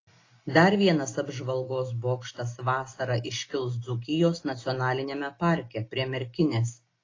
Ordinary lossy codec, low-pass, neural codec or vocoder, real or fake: AAC, 32 kbps; 7.2 kHz; none; real